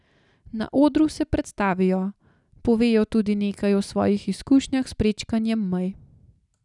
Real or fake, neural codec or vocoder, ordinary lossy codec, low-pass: real; none; none; 10.8 kHz